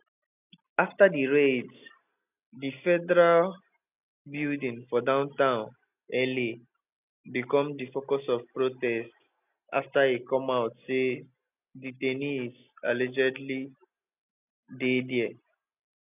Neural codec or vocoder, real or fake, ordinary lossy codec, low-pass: none; real; none; 3.6 kHz